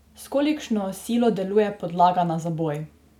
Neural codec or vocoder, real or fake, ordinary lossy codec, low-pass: none; real; none; 19.8 kHz